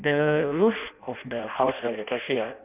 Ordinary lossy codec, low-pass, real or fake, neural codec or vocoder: none; 3.6 kHz; fake; codec, 16 kHz in and 24 kHz out, 0.6 kbps, FireRedTTS-2 codec